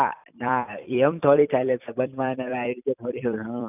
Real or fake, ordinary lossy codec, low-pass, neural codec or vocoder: fake; none; 3.6 kHz; vocoder, 44.1 kHz, 128 mel bands every 256 samples, BigVGAN v2